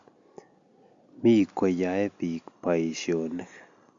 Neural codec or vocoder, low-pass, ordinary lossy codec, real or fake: none; 7.2 kHz; Opus, 64 kbps; real